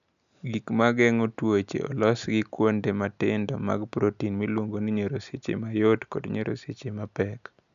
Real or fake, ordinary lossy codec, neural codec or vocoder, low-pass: real; none; none; 7.2 kHz